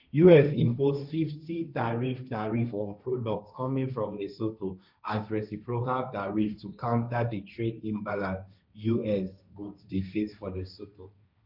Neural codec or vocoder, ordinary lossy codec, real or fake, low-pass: codec, 16 kHz, 1.1 kbps, Voila-Tokenizer; none; fake; 5.4 kHz